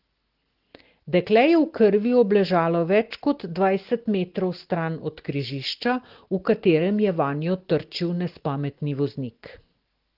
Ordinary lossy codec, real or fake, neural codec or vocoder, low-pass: Opus, 16 kbps; real; none; 5.4 kHz